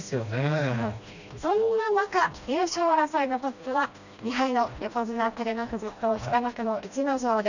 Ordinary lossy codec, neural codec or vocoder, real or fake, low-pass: none; codec, 16 kHz, 1 kbps, FreqCodec, smaller model; fake; 7.2 kHz